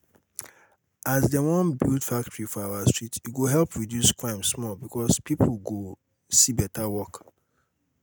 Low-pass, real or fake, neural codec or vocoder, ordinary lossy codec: none; real; none; none